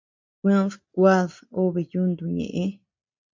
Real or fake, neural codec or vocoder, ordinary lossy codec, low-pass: real; none; MP3, 48 kbps; 7.2 kHz